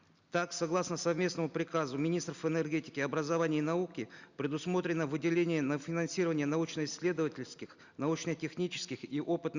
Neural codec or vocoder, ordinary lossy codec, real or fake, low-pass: none; Opus, 64 kbps; real; 7.2 kHz